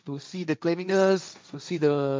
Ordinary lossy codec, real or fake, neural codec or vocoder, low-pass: none; fake; codec, 16 kHz, 1.1 kbps, Voila-Tokenizer; none